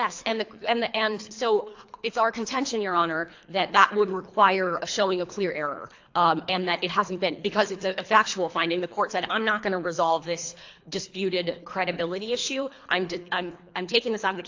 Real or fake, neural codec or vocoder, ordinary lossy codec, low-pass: fake; codec, 24 kHz, 3 kbps, HILCodec; AAC, 48 kbps; 7.2 kHz